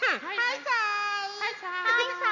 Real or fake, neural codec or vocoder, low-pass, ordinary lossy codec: real; none; 7.2 kHz; none